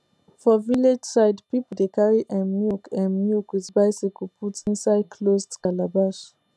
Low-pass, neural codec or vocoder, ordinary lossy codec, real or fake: none; none; none; real